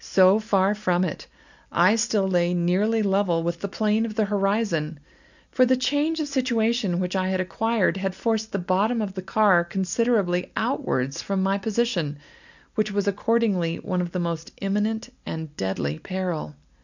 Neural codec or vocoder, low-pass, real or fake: none; 7.2 kHz; real